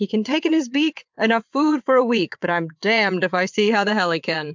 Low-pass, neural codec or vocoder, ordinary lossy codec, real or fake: 7.2 kHz; vocoder, 22.05 kHz, 80 mel bands, WaveNeXt; MP3, 64 kbps; fake